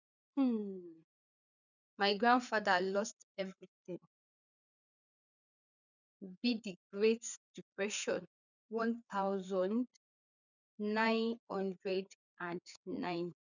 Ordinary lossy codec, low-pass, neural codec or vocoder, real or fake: none; 7.2 kHz; codec, 16 kHz, 4 kbps, FreqCodec, larger model; fake